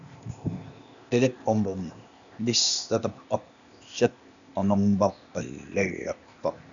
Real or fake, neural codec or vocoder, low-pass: fake; codec, 16 kHz, 0.8 kbps, ZipCodec; 7.2 kHz